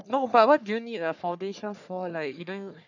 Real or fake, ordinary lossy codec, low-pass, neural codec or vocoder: fake; none; 7.2 kHz; codec, 44.1 kHz, 3.4 kbps, Pupu-Codec